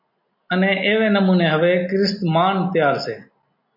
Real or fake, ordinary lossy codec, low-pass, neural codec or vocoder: real; MP3, 32 kbps; 5.4 kHz; none